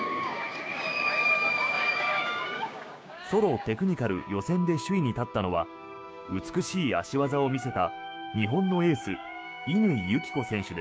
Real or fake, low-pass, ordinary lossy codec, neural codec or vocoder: fake; none; none; codec, 16 kHz, 6 kbps, DAC